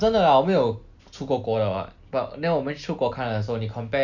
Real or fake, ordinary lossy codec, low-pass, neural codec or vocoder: real; none; 7.2 kHz; none